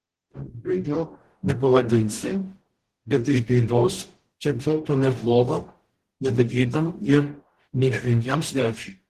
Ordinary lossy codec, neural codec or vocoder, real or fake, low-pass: Opus, 16 kbps; codec, 44.1 kHz, 0.9 kbps, DAC; fake; 14.4 kHz